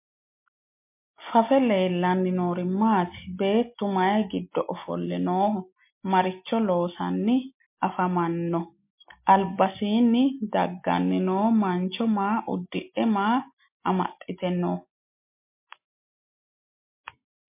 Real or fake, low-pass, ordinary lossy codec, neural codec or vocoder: real; 3.6 kHz; MP3, 24 kbps; none